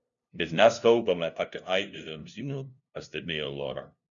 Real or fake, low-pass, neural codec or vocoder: fake; 7.2 kHz; codec, 16 kHz, 0.5 kbps, FunCodec, trained on LibriTTS, 25 frames a second